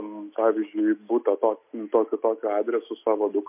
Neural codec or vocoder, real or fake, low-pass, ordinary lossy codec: none; real; 3.6 kHz; MP3, 32 kbps